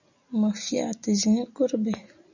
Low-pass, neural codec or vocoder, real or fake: 7.2 kHz; none; real